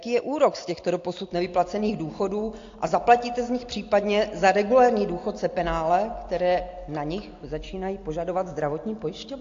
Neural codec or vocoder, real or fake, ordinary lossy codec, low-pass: none; real; AAC, 48 kbps; 7.2 kHz